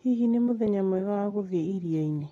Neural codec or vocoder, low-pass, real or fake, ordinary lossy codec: none; 19.8 kHz; real; AAC, 32 kbps